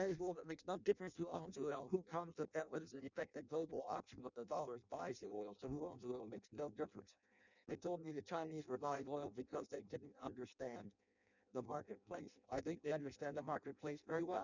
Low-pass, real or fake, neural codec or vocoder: 7.2 kHz; fake; codec, 16 kHz in and 24 kHz out, 0.6 kbps, FireRedTTS-2 codec